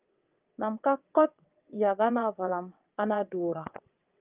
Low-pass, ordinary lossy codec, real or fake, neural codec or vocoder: 3.6 kHz; Opus, 24 kbps; fake; vocoder, 22.05 kHz, 80 mel bands, WaveNeXt